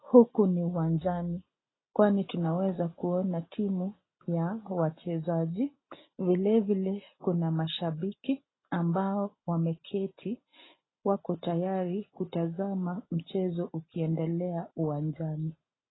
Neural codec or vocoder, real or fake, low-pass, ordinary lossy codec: none; real; 7.2 kHz; AAC, 16 kbps